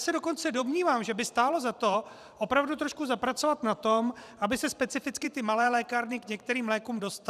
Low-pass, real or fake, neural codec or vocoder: 14.4 kHz; fake; vocoder, 44.1 kHz, 128 mel bands every 512 samples, BigVGAN v2